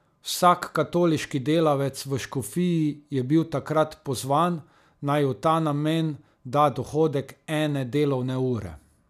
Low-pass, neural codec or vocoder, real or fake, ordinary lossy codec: 14.4 kHz; none; real; none